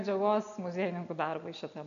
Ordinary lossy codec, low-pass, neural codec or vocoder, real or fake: AAC, 96 kbps; 7.2 kHz; none; real